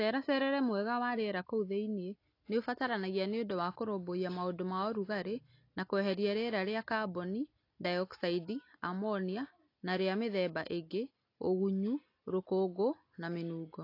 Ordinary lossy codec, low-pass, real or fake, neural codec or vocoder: AAC, 32 kbps; 5.4 kHz; real; none